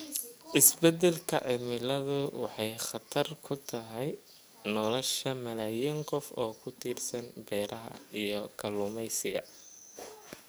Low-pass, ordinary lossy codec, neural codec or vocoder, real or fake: none; none; codec, 44.1 kHz, 7.8 kbps, DAC; fake